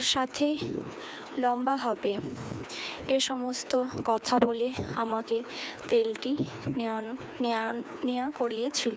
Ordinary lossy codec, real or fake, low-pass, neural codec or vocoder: none; fake; none; codec, 16 kHz, 2 kbps, FreqCodec, larger model